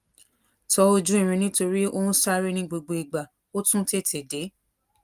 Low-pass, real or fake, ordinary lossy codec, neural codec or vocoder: 14.4 kHz; real; Opus, 32 kbps; none